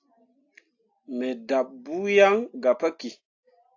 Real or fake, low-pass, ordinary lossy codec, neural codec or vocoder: real; 7.2 kHz; AAC, 48 kbps; none